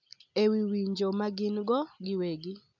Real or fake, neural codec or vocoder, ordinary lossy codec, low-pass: real; none; none; 7.2 kHz